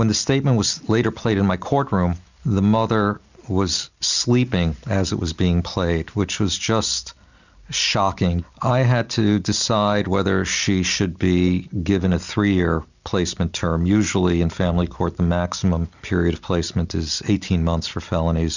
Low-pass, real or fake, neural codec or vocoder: 7.2 kHz; real; none